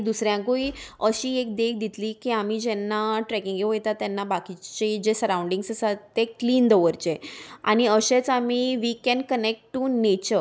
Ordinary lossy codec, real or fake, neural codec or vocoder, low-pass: none; real; none; none